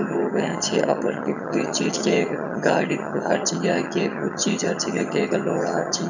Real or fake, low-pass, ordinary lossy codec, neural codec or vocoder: fake; 7.2 kHz; none; vocoder, 22.05 kHz, 80 mel bands, HiFi-GAN